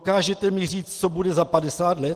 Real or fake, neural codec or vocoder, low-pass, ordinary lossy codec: real; none; 14.4 kHz; Opus, 16 kbps